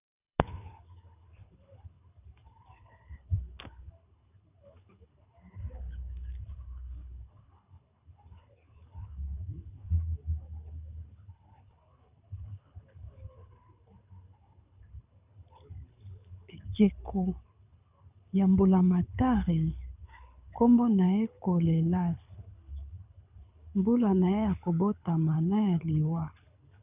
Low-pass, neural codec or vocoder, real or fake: 3.6 kHz; codec, 24 kHz, 6 kbps, HILCodec; fake